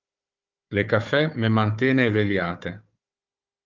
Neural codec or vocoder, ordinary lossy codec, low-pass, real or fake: codec, 16 kHz, 4 kbps, FunCodec, trained on Chinese and English, 50 frames a second; Opus, 24 kbps; 7.2 kHz; fake